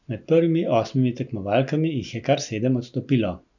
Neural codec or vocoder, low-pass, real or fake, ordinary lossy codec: none; 7.2 kHz; real; none